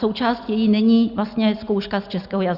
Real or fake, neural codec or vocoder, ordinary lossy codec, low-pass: real; none; Opus, 64 kbps; 5.4 kHz